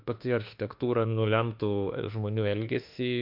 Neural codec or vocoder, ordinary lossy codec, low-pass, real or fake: autoencoder, 48 kHz, 32 numbers a frame, DAC-VAE, trained on Japanese speech; MP3, 48 kbps; 5.4 kHz; fake